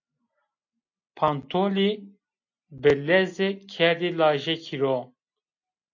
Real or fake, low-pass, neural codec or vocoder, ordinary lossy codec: real; 7.2 kHz; none; AAC, 48 kbps